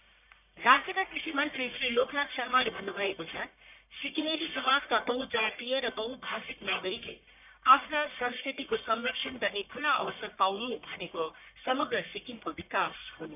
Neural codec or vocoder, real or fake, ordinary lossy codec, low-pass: codec, 44.1 kHz, 1.7 kbps, Pupu-Codec; fake; none; 3.6 kHz